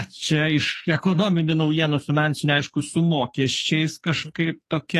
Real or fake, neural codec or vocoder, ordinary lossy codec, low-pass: fake; codec, 44.1 kHz, 2.6 kbps, SNAC; AAC, 48 kbps; 14.4 kHz